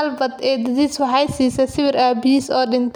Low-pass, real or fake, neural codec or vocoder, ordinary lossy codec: 19.8 kHz; real; none; none